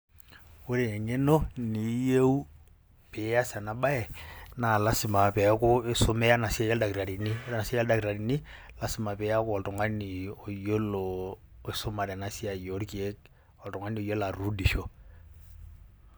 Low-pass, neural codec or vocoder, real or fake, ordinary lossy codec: none; none; real; none